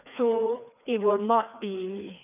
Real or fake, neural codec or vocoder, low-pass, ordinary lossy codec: fake; codec, 16 kHz, 2 kbps, FreqCodec, larger model; 3.6 kHz; none